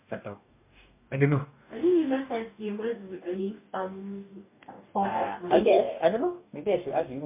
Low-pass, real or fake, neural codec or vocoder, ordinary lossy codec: 3.6 kHz; fake; codec, 44.1 kHz, 2.6 kbps, DAC; MP3, 32 kbps